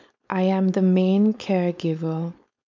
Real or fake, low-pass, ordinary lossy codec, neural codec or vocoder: fake; 7.2 kHz; MP3, 64 kbps; codec, 16 kHz, 4.8 kbps, FACodec